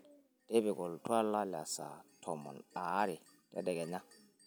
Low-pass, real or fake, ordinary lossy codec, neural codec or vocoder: none; real; none; none